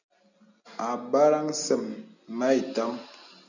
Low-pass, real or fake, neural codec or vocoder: 7.2 kHz; real; none